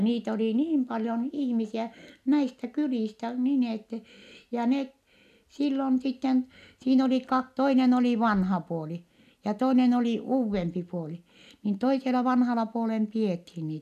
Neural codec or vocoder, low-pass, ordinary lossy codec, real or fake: none; 14.4 kHz; none; real